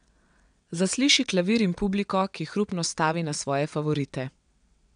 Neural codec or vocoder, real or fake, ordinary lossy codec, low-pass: vocoder, 22.05 kHz, 80 mel bands, WaveNeXt; fake; none; 9.9 kHz